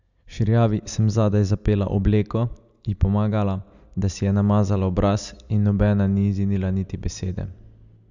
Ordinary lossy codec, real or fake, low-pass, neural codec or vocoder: none; real; 7.2 kHz; none